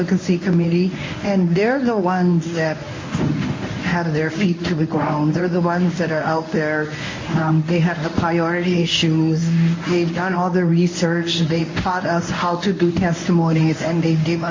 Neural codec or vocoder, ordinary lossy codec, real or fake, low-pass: codec, 24 kHz, 0.9 kbps, WavTokenizer, medium speech release version 1; MP3, 32 kbps; fake; 7.2 kHz